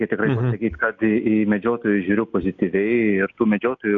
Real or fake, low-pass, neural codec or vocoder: real; 7.2 kHz; none